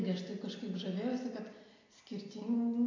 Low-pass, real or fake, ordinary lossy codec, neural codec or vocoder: 7.2 kHz; real; AAC, 32 kbps; none